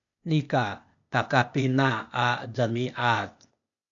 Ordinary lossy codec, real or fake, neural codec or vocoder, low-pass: MP3, 96 kbps; fake; codec, 16 kHz, 0.8 kbps, ZipCodec; 7.2 kHz